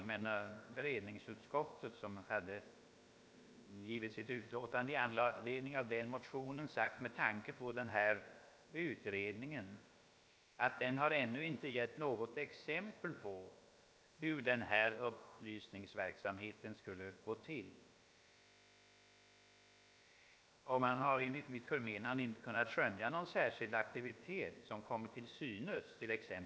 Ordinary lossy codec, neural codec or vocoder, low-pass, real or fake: none; codec, 16 kHz, about 1 kbps, DyCAST, with the encoder's durations; none; fake